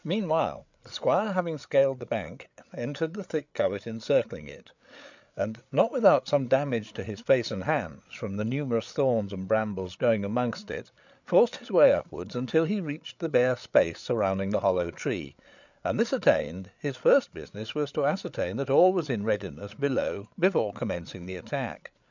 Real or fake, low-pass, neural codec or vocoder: fake; 7.2 kHz; codec, 16 kHz, 8 kbps, FreqCodec, larger model